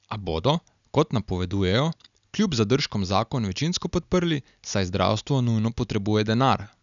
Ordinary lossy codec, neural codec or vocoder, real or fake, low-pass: none; none; real; 7.2 kHz